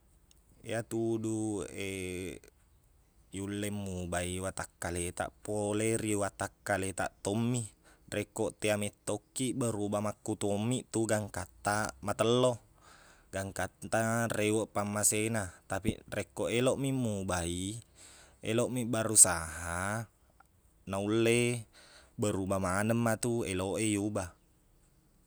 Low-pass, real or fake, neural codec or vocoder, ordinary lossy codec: none; real; none; none